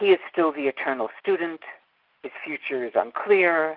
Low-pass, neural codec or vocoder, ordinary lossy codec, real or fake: 5.4 kHz; none; Opus, 24 kbps; real